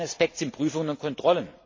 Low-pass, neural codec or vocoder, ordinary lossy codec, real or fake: 7.2 kHz; none; MP3, 32 kbps; real